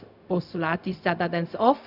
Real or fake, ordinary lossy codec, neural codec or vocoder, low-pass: fake; none; codec, 16 kHz, 0.4 kbps, LongCat-Audio-Codec; 5.4 kHz